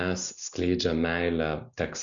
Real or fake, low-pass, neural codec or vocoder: real; 7.2 kHz; none